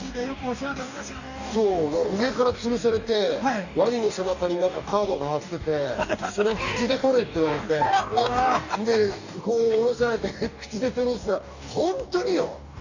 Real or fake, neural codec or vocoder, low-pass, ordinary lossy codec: fake; codec, 44.1 kHz, 2.6 kbps, DAC; 7.2 kHz; none